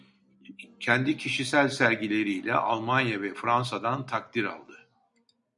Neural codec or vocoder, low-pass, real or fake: none; 10.8 kHz; real